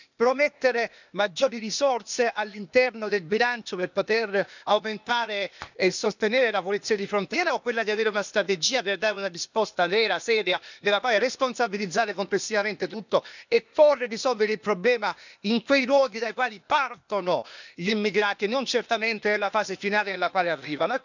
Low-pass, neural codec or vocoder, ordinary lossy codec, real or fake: 7.2 kHz; codec, 16 kHz, 0.8 kbps, ZipCodec; none; fake